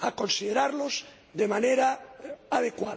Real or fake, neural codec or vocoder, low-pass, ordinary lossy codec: real; none; none; none